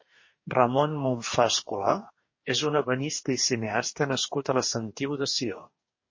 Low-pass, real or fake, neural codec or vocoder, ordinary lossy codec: 7.2 kHz; fake; codec, 44.1 kHz, 2.6 kbps, DAC; MP3, 32 kbps